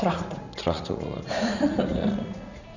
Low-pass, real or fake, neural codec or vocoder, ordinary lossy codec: 7.2 kHz; fake; vocoder, 44.1 kHz, 80 mel bands, Vocos; none